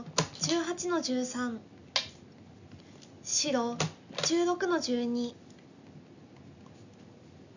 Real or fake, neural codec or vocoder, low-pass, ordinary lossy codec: real; none; 7.2 kHz; none